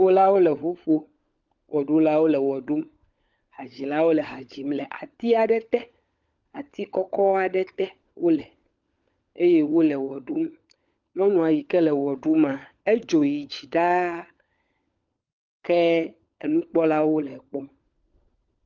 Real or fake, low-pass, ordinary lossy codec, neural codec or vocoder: fake; 7.2 kHz; Opus, 24 kbps; codec, 16 kHz, 16 kbps, FunCodec, trained on LibriTTS, 50 frames a second